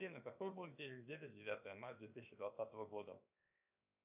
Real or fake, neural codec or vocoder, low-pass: fake; codec, 16 kHz, 0.7 kbps, FocalCodec; 3.6 kHz